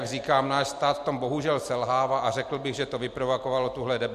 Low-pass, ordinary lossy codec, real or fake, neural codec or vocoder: 14.4 kHz; MP3, 64 kbps; real; none